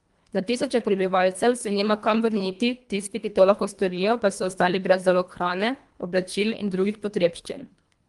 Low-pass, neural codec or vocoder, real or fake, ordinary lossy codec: 10.8 kHz; codec, 24 kHz, 1.5 kbps, HILCodec; fake; Opus, 32 kbps